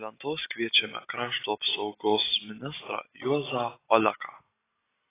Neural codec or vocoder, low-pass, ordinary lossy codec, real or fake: none; 3.6 kHz; AAC, 16 kbps; real